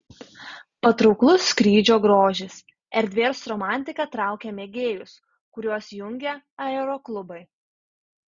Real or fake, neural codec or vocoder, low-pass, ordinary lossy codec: real; none; 7.2 kHz; Opus, 64 kbps